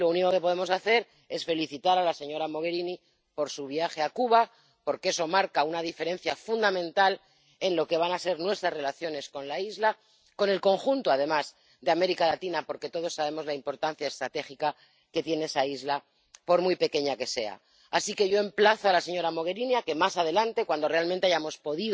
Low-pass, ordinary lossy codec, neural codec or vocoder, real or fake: none; none; none; real